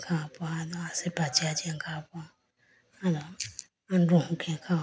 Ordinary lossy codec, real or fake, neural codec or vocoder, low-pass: none; real; none; none